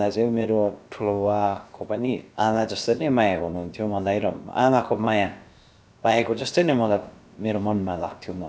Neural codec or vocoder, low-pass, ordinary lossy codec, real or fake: codec, 16 kHz, about 1 kbps, DyCAST, with the encoder's durations; none; none; fake